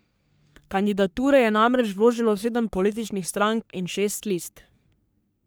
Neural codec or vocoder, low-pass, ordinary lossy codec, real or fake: codec, 44.1 kHz, 3.4 kbps, Pupu-Codec; none; none; fake